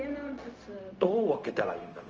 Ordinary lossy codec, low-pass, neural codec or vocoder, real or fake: Opus, 24 kbps; 7.2 kHz; codec, 16 kHz, 0.4 kbps, LongCat-Audio-Codec; fake